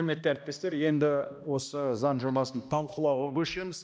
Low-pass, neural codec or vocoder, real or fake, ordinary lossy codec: none; codec, 16 kHz, 1 kbps, X-Codec, HuBERT features, trained on balanced general audio; fake; none